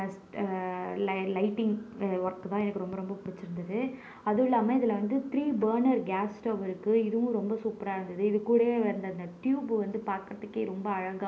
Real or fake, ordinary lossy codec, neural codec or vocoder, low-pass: real; none; none; none